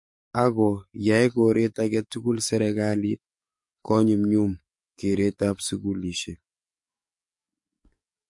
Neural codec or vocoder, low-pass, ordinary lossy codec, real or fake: codec, 44.1 kHz, 7.8 kbps, DAC; 10.8 kHz; MP3, 48 kbps; fake